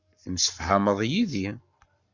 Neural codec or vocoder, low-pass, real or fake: codec, 44.1 kHz, 7.8 kbps, Pupu-Codec; 7.2 kHz; fake